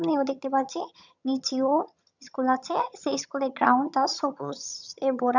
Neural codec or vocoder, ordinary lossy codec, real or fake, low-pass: vocoder, 22.05 kHz, 80 mel bands, HiFi-GAN; none; fake; 7.2 kHz